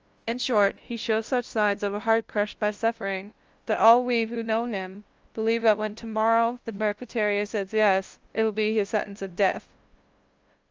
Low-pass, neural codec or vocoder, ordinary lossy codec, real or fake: 7.2 kHz; codec, 16 kHz, 0.5 kbps, FunCodec, trained on LibriTTS, 25 frames a second; Opus, 24 kbps; fake